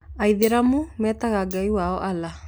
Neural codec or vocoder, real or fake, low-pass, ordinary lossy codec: none; real; none; none